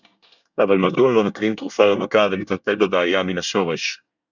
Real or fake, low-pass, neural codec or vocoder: fake; 7.2 kHz; codec, 24 kHz, 1 kbps, SNAC